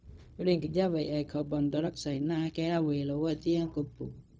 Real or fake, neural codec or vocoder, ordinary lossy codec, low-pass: fake; codec, 16 kHz, 0.4 kbps, LongCat-Audio-Codec; none; none